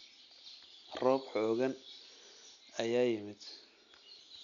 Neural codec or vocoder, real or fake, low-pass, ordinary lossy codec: none; real; 7.2 kHz; none